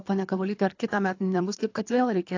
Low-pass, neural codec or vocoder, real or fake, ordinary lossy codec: 7.2 kHz; codec, 24 kHz, 3 kbps, HILCodec; fake; AAC, 48 kbps